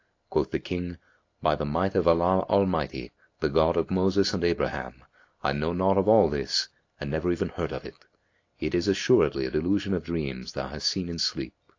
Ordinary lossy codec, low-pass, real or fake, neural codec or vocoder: AAC, 48 kbps; 7.2 kHz; real; none